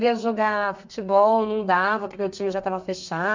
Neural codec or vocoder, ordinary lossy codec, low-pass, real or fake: codec, 32 kHz, 1.9 kbps, SNAC; MP3, 64 kbps; 7.2 kHz; fake